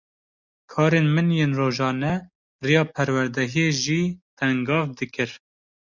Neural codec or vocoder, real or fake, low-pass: none; real; 7.2 kHz